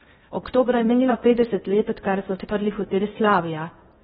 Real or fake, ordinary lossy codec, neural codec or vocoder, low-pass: fake; AAC, 16 kbps; codec, 16 kHz in and 24 kHz out, 0.6 kbps, FocalCodec, streaming, 2048 codes; 10.8 kHz